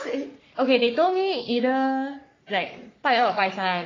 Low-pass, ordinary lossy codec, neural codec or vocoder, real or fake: 7.2 kHz; AAC, 32 kbps; codec, 44.1 kHz, 3.4 kbps, Pupu-Codec; fake